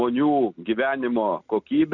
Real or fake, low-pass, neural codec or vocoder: real; 7.2 kHz; none